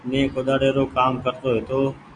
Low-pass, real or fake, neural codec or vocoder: 9.9 kHz; real; none